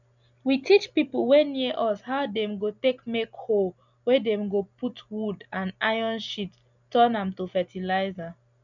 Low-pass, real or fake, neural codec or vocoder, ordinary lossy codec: 7.2 kHz; real; none; none